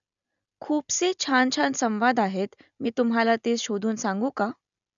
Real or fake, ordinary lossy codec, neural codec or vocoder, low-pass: real; none; none; 7.2 kHz